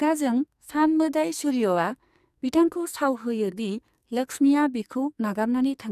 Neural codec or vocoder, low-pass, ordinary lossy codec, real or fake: codec, 44.1 kHz, 2.6 kbps, SNAC; 14.4 kHz; none; fake